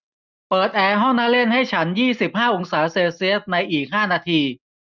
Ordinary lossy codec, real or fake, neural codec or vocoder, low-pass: none; real; none; 7.2 kHz